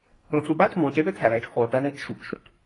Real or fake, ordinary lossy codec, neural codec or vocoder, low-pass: fake; AAC, 32 kbps; codec, 44.1 kHz, 2.6 kbps, SNAC; 10.8 kHz